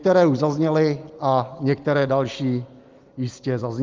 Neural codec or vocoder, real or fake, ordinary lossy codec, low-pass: none; real; Opus, 32 kbps; 7.2 kHz